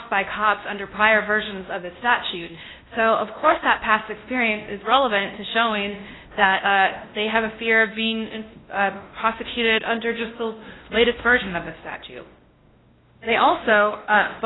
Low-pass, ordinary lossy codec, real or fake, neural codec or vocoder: 7.2 kHz; AAC, 16 kbps; fake; codec, 16 kHz, 1 kbps, X-Codec, WavLM features, trained on Multilingual LibriSpeech